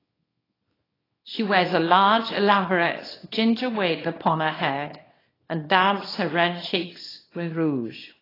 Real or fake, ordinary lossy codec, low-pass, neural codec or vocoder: fake; AAC, 24 kbps; 5.4 kHz; codec, 24 kHz, 0.9 kbps, WavTokenizer, small release